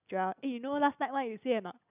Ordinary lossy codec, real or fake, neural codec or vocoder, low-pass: none; real; none; 3.6 kHz